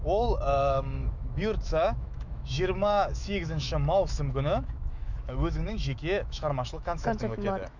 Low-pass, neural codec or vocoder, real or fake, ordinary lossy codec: 7.2 kHz; autoencoder, 48 kHz, 128 numbers a frame, DAC-VAE, trained on Japanese speech; fake; none